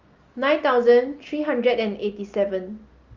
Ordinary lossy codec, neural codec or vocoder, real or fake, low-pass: Opus, 32 kbps; none; real; 7.2 kHz